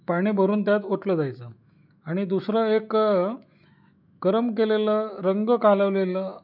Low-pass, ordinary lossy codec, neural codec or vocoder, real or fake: 5.4 kHz; none; autoencoder, 48 kHz, 128 numbers a frame, DAC-VAE, trained on Japanese speech; fake